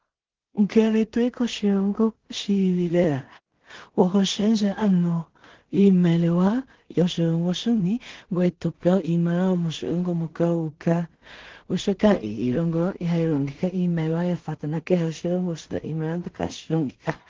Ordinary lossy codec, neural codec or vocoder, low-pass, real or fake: Opus, 16 kbps; codec, 16 kHz in and 24 kHz out, 0.4 kbps, LongCat-Audio-Codec, two codebook decoder; 7.2 kHz; fake